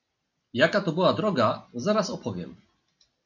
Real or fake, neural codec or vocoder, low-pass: real; none; 7.2 kHz